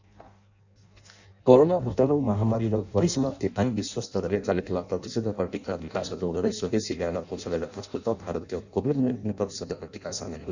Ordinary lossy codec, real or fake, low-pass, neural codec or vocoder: none; fake; 7.2 kHz; codec, 16 kHz in and 24 kHz out, 0.6 kbps, FireRedTTS-2 codec